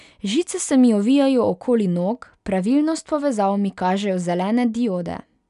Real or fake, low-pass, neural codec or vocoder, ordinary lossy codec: real; 10.8 kHz; none; none